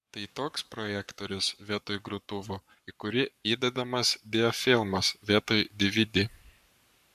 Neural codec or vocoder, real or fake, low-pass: codec, 44.1 kHz, 7.8 kbps, Pupu-Codec; fake; 14.4 kHz